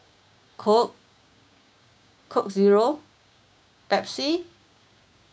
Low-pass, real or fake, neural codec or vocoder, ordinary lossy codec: none; fake; codec, 16 kHz, 6 kbps, DAC; none